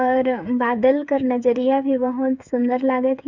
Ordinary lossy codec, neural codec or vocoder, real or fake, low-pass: none; codec, 16 kHz, 8 kbps, FreqCodec, smaller model; fake; 7.2 kHz